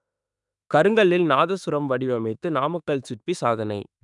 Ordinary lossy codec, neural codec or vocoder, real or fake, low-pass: none; autoencoder, 48 kHz, 32 numbers a frame, DAC-VAE, trained on Japanese speech; fake; 10.8 kHz